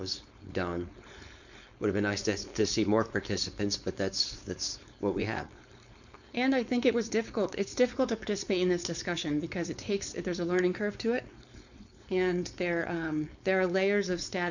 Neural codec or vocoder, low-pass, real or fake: codec, 16 kHz, 4.8 kbps, FACodec; 7.2 kHz; fake